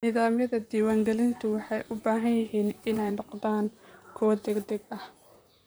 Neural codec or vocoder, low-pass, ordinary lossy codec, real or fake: vocoder, 44.1 kHz, 128 mel bands, Pupu-Vocoder; none; none; fake